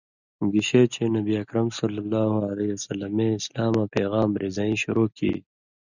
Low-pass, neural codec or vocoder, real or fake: 7.2 kHz; none; real